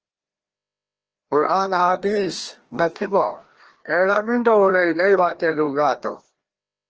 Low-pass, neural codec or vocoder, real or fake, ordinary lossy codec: 7.2 kHz; codec, 16 kHz, 1 kbps, FreqCodec, larger model; fake; Opus, 16 kbps